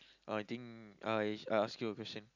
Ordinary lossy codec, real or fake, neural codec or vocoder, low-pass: none; real; none; 7.2 kHz